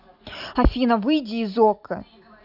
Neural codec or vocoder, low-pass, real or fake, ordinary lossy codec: none; 5.4 kHz; real; none